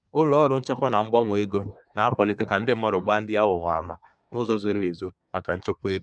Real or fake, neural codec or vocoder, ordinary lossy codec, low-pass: fake; codec, 24 kHz, 1 kbps, SNAC; none; 9.9 kHz